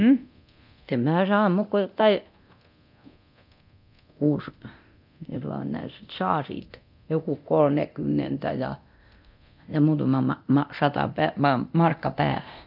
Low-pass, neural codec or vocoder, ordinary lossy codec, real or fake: 5.4 kHz; codec, 24 kHz, 0.9 kbps, DualCodec; none; fake